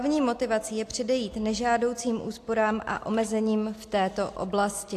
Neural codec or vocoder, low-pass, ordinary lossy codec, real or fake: none; 14.4 kHz; AAC, 64 kbps; real